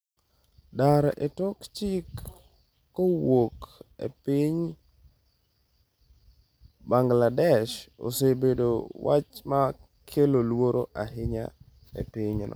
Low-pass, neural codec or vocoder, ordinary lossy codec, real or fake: none; none; none; real